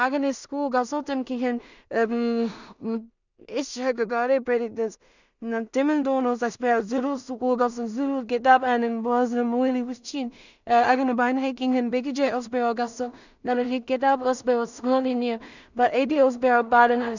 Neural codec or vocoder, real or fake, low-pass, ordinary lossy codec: codec, 16 kHz in and 24 kHz out, 0.4 kbps, LongCat-Audio-Codec, two codebook decoder; fake; 7.2 kHz; none